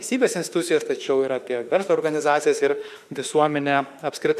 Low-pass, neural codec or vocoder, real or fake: 14.4 kHz; autoencoder, 48 kHz, 32 numbers a frame, DAC-VAE, trained on Japanese speech; fake